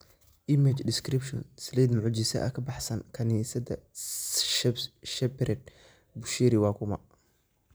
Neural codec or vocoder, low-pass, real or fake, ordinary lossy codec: none; none; real; none